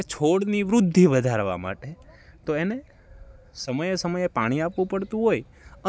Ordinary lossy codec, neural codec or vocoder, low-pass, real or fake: none; none; none; real